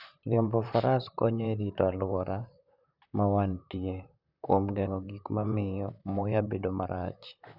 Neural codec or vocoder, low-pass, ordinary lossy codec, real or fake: vocoder, 44.1 kHz, 128 mel bands, Pupu-Vocoder; 5.4 kHz; none; fake